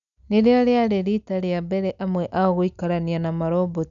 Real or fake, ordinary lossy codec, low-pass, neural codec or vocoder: real; none; 7.2 kHz; none